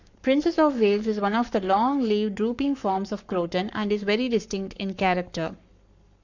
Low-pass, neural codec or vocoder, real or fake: 7.2 kHz; codec, 44.1 kHz, 7.8 kbps, Pupu-Codec; fake